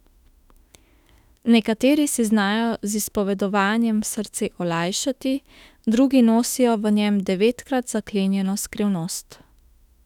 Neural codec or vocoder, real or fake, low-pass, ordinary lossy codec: autoencoder, 48 kHz, 32 numbers a frame, DAC-VAE, trained on Japanese speech; fake; 19.8 kHz; none